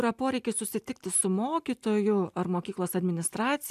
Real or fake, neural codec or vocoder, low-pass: real; none; 14.4 kHz